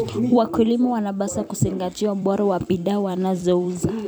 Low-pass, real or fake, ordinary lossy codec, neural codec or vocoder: none; fake; none; vocoder, 44.1 kHz, 128 mel bands every 256 samples, BigVGAN v2